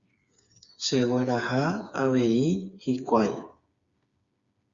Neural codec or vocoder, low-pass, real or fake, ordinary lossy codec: codec, 16 kHz, 4 kbps, FreqCodec, smaller model; 7.2 kHz; fake; Opus, 64 kbps